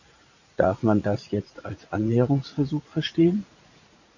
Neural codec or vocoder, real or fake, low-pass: vocoder, 44.1 kHz, 80 mel bands, Vocos; fake; 7.2 kHz